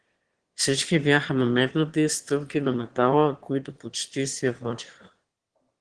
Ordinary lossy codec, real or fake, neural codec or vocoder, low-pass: Opus, 16 kbps; fake; autoencoder, 22.05 kHz, a latent of 192 numbers a frame, VITS, trained on one speaker; 9.9 kHz